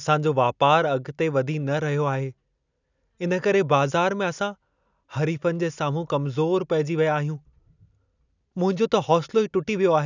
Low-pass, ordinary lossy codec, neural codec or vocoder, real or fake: 7.2 kHz; none; none; real